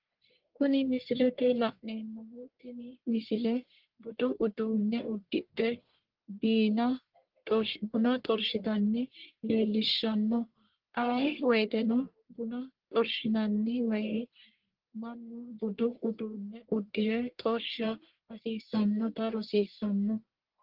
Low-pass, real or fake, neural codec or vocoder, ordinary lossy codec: 5.4 kHz; fake; codec, 44.1 kHz, 1.7 kbps, Pupu-Codec; Opus, 16 kbps